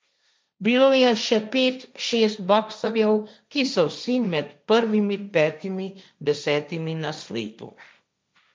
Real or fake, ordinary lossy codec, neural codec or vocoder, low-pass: fake; none; codec, 16 kHz, 1.1 kbps, Voila-Tokenizer; none